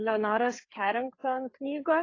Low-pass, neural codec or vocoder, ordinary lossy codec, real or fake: 7.2 kHz; codec, 16 kHz, 4 kbps, FreqCodec, larger model; AAC, 32 kbps; fake